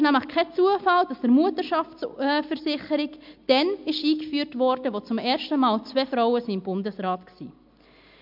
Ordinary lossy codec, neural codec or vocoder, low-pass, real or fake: MP3, 48 kbps; none; 5.4 kHz; real